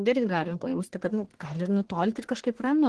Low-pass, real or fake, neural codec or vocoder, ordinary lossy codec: 10.8 kHz; fake; codec, 44.1 kHz, 1.7 kbps, Pupu-Codec; Opus, 16 kbps